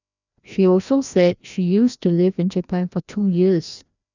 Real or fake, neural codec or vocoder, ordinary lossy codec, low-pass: fake; codec, 16 kHz, 1 kbps, FreqCodec, larger model; none; 7.2 kHz